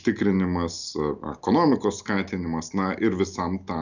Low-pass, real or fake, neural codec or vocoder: 7.2 kHz; real; none